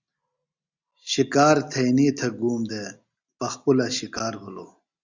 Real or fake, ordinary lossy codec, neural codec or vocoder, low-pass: real; Opus, 64 kbps; none; 7.2 kHz